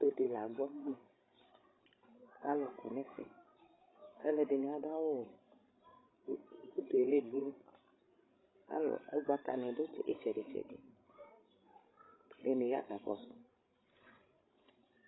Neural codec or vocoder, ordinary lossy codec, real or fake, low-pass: codec, 16 kHz, 16 kbps, FreqCodec, larger model; AAC, 16 kbps; fake; 7.2 kHz